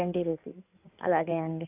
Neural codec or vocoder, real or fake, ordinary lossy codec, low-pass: codec, 16 kHz in and 24 kHz out, 2.2 kbps, FireRedTTS-2 codec; fake; none; 3.6 kHz